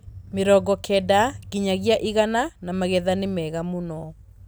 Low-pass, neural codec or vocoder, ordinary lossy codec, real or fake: none; none; none; real